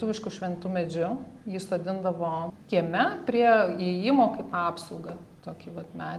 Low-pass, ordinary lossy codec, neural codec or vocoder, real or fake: 14.4 kHz; Opus, 24 kbps; none; real